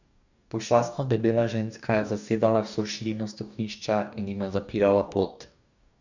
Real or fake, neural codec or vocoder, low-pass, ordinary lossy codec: fake; codec, 44.1 kHz, 2.6 kbps, DAC; 7.2 kHz; none